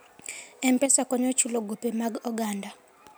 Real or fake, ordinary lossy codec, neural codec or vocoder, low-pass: real; none; none; none